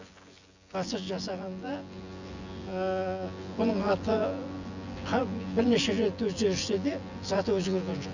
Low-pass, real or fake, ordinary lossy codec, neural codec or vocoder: 7.2 kHz; fake; Opus, 64 kbps; vocoder, 24 kHz, 100 mel bands, Vocos